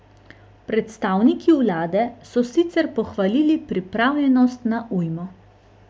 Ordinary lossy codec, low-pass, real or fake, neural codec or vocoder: none; none; real; none